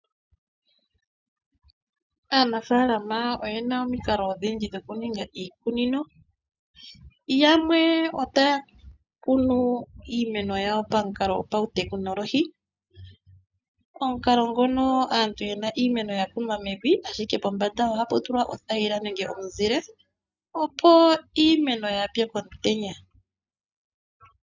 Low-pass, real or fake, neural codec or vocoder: 7.2 kHz; real; none